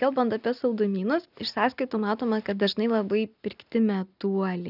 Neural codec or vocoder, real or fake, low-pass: none; real; 5.4 kHz